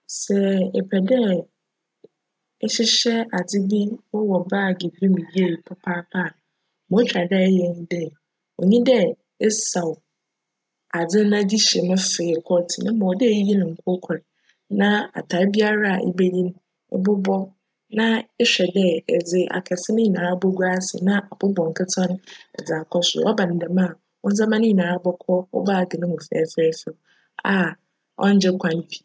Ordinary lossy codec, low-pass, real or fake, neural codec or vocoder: none; none; real; none